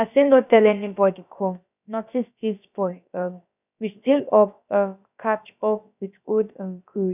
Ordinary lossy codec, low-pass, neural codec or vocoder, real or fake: none; 3.6 kHz; codec, 16 kHz, about 1 kbps, DyCAST, with the encoder's durations; fake